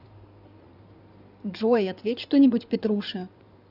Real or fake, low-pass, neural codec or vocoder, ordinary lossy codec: fake; 5.4 kHz; codec, 16 kHz in and 24 kHz out, 2.2 kbps, FireRedTTS-2 codec; none